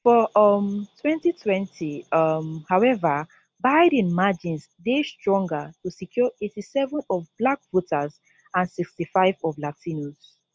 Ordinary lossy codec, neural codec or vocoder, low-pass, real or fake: none; none; none; real